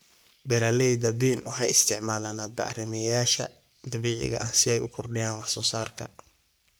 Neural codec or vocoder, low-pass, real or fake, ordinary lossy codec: codec, 44.1 kHz, 3.4 kbps, Pupu-Codec; none; fake; none